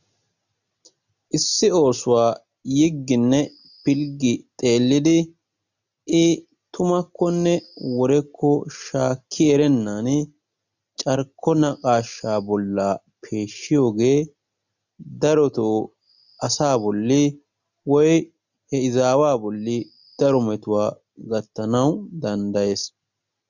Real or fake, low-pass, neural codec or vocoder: real; 7.2 kHz; none